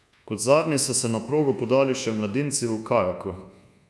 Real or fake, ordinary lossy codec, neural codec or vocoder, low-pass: fake; none; codec, 24 kHz, 1.2 kbps, DualCodec; none